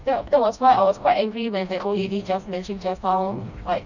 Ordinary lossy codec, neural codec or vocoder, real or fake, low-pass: none; codec, 16 kHz, 1 kbps, FreqCodec, smaller model; fake; 7.2 kHz